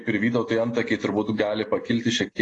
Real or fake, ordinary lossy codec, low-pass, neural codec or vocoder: real; AAC, 32 kbps; 10.8 kHz; none